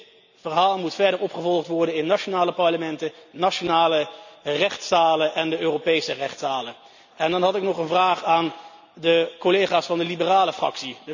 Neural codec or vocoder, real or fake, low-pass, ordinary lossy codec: none; real; 7.2 kHz; none